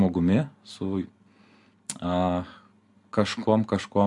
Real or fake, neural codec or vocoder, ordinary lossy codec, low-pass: real; none; MP3, 96 kbps; 10.8 kHz